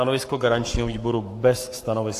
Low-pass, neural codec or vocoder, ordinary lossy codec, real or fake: 14.4 kHz; codec, 44.1 kHz, 7.8 kbps, Pupu-Codec; AAC, 64 kbps; fake